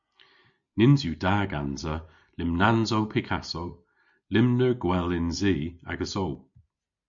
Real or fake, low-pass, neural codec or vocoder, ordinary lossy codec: real; 7.2 kHz; none; MP3, 64 kbps